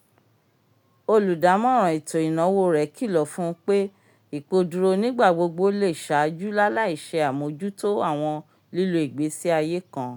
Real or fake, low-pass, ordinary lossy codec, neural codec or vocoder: real; 19.8 kHz; none; none